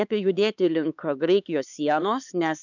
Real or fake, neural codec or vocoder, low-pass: fake; codec, 16 kHz, 4 kbps, X-Codec, HuBERT features, trained on LibriSpeech; 7.2 kHz